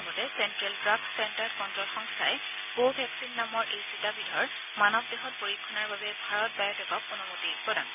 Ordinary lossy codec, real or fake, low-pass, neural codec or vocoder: none; real; 3.6 kHz; none